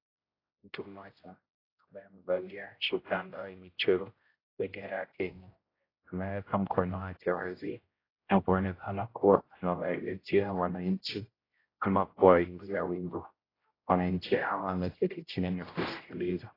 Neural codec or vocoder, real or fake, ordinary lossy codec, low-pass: codec, 16 kHz, 0.5 kbps, X-Codec, HuBERT features, trained on general audio; fake; AAC, 24 kbps; 5.4 kHz